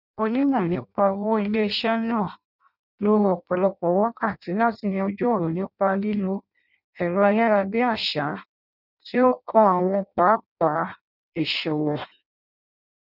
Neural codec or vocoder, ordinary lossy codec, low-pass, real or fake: codec, 16 kHz in and 24 kHz out, 0.6 kbps, FireRedTTS-2 codec; none; 5.4 kHz; fake